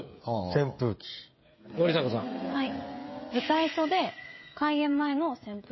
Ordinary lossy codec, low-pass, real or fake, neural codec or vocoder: MP3, 24 kbps; 7.2 kHz; fake; codec, 16 kHz, 16 kbps, FreqCodec, smaller model